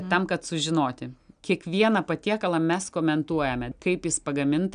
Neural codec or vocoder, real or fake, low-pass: none; real; 9.9 kHz